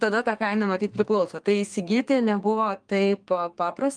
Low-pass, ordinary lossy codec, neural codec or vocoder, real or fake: 9.9 kHz; Opus, 32 kbps; codec, 32 kHz, 1.9 kbps, SNAC; fake